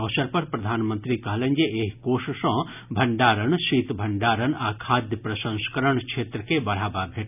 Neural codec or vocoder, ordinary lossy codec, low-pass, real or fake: none; none; 3.6 kHz; real